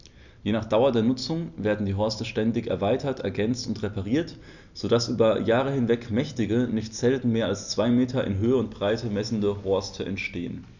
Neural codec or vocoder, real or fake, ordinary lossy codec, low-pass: none; real; none; 7.2 kHz